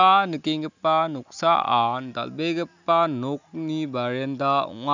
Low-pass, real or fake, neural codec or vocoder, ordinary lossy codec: 7.2 kHz; real; none; none